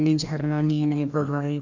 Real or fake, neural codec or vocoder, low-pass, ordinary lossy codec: fake; codec, 16 kHz, 1 kbps, FreqCodec, larger model; 7.2 kHz; none